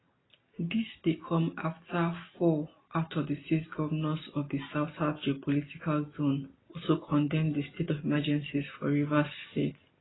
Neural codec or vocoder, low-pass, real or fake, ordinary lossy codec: none; 7.2 kHz; real; AAC, 16 kbps